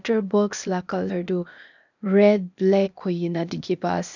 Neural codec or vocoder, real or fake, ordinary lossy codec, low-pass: codec, 16 kHz, 0.8 kbps, ZipCodec; fake; none; 7.2 kHz